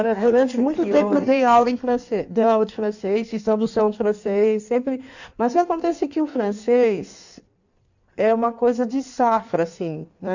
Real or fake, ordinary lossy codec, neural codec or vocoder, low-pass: fake; none; codec, 16 kHz in and 24 kHz out, 1.1 kbps, FireRedTTS-2 codec; 7.2 kHz